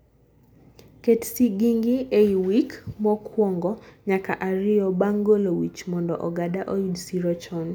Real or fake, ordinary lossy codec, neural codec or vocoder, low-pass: real; none; none; none